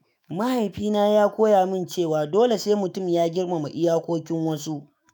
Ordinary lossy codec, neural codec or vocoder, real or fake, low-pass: none; autoencoder, 48 kHz, 128 numbers a frame, DAC-VAE, trained on Japanese speech; fake; none